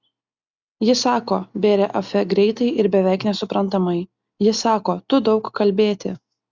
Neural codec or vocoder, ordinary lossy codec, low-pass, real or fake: none; Opus, 64 kbps; 7.2 kHz; real